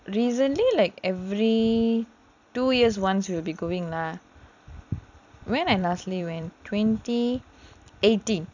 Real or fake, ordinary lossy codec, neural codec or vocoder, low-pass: fake; none; vocoder, 44.1 kHz, 128 mel bands every 256 samples, BigVGAN v2; 7.2 kHz